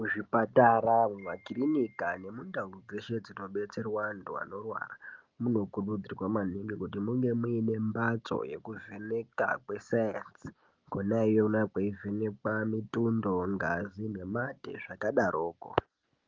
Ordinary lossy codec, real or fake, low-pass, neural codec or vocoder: Opus, 24 kbps; real; 7.2 kHz; none